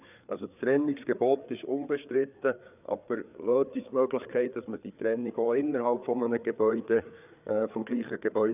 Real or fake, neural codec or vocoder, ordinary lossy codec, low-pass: fake; codec, 16 kHz, 4 kbps, FreqCodec, larger model; none; 3.6 kHz